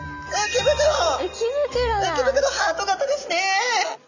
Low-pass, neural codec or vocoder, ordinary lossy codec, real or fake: 7.2 kHz; none; none; real